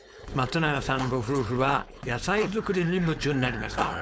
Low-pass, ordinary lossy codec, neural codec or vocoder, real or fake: none; none; codec, 16 kHz, 4.8 kbps, FACodec; fake